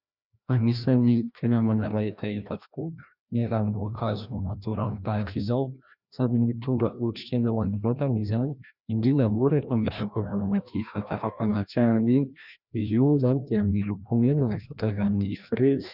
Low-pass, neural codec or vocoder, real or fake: 5.4 kHz; codec, 16 kHz, 1 kbps, FreqCodec, larger model; fake